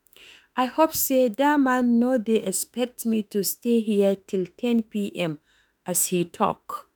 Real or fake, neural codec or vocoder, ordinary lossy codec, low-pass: fake; autoencoder, 48 kHz, 32 numbers a frame, DAC-VAE, trained on Japanese speech; none; none